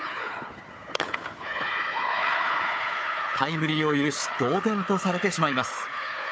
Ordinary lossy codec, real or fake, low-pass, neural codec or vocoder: none; fake; none; codec, 16 kHz, 4 kbps, FunCodec, trained on Chinese and English, 50 frames a second